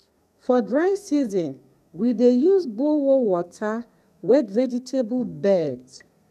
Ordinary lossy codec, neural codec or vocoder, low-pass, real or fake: none; codec, 32 kHz, 1.9 kbps, SNAC; 14.4 kHz; fake